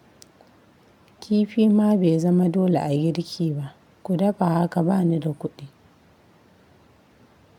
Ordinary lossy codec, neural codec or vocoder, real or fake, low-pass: MP3, 96 kbps; none; real; 19.8 kHz